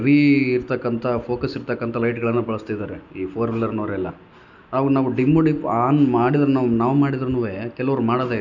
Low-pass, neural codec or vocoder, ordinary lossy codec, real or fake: 7.2 kHz; none; none; real